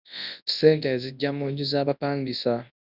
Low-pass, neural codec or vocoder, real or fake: 5.4 kHz; codec, 24 kHz, 0.9 kbps, WavTokenizer, large speech release; fake